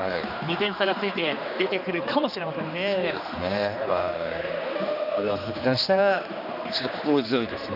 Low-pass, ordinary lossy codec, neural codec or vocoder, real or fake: 5.4 kHz; none; codec, 16 kHz, 2 kbps, X-Codec, HuBERT features, trained on general audio; fake